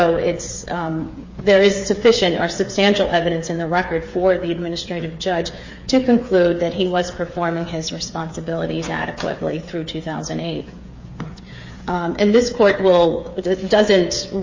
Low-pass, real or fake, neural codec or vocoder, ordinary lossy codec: 7.2 kHz; fake; codec, 16 kHz, 8 kbps, FreqCodec, smaller model; MP3, 32 kbps